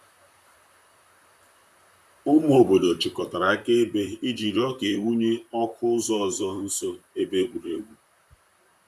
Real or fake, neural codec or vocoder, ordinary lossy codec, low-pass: fake; vocoder, 44.1 kHz, 128 mel bands, Pupu-Vocoder; none; 14.4 kHz